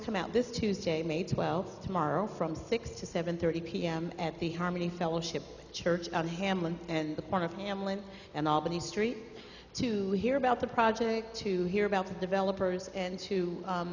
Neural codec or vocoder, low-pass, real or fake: none; 7.2 kHz; real